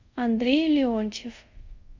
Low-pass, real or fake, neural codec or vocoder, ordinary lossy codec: 7.2 kHz; fake; codec, 24 kHz, 0.5 kbps, DualCodec; Opus, 64 kbps